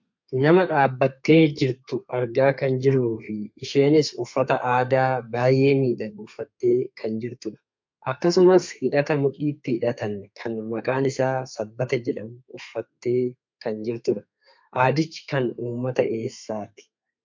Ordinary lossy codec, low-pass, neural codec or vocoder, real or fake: MP3, 48 kbps; 7.2 kHz; codec, 32 kHz, 1.9 kbps, SNAC; fake